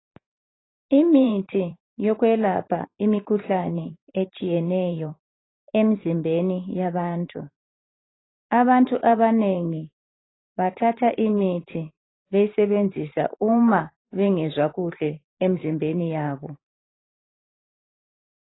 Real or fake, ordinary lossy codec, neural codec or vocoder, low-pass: fake; AAC, 16 kbps; vocoder, 44.1 kHz, 128 mel bands every 512 samples, BigVGAN v2; 7.2 kHz